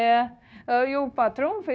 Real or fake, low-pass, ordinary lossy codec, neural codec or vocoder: fake; none; none; codec, 16 kHz, 0.9 kbps, LongCat-Audio-Codec